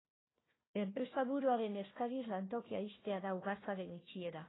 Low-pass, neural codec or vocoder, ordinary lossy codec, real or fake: 7.2 kHz; codec, 16 kHz, 1 kbps, FunCodec, trained on Chinese and English, 50 frames a second; AAC, 16 kbps; fake